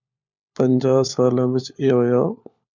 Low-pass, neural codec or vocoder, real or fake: 7.2 kHz; codec, 16 kHz, 4 kbps, FunCodec, trained on LibriTTS, 50 frames a second; fake